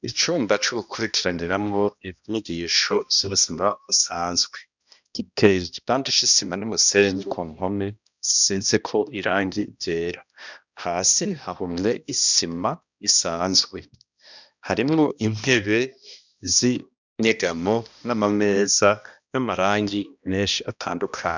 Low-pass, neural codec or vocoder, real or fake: 7.2 kHz; codec, 16 kHz, 1 kbps, X-Codec, HuBERT features, trained on balanced general audio; fake